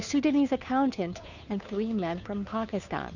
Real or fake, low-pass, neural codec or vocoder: fake; 7.2 kHz; codec, 16 kHz, 2 kbps, FunCodec, trained on Chinese and English, 25 frames a second